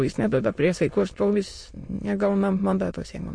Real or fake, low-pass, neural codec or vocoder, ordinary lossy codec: fake; 9.9 kHz; autoencoder, 22.05 kHz, a latent of 192 numbers a frame, VITS, trained on many speakers; MP3, 48 kbps